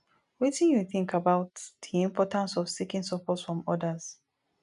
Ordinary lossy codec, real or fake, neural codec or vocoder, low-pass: none; real; none; 10.8 kHz